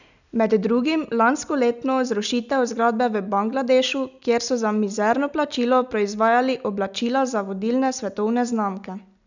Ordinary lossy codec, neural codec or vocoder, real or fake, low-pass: none; none; real; 7.2 kHz